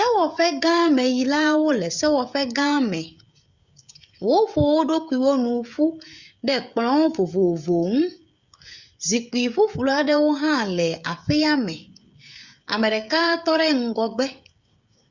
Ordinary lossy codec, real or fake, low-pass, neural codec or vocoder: Opus, 64 kbps; fake; 7.2 kHz; codec, 16 kHz, 16 kbps, FreqCodec, smaller model